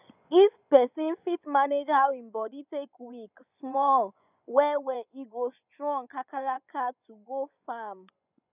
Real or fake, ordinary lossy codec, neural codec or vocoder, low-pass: fake; none; vocoder, 24 kHz, 100 mel bands, Vocos; 3.6 kHz